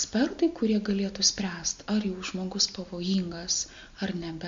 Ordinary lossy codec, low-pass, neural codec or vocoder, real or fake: MP3, 48 kbps; 7.2 kHz; none; real